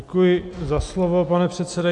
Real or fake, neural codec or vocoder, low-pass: real; none; 10.8 kHz